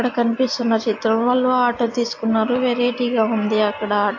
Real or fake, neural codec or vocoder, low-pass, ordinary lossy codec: real; none; 7.2 kHz; AAC, 48 kbps